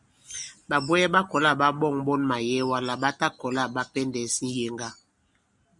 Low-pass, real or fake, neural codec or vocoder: 10.8 kHz; real; none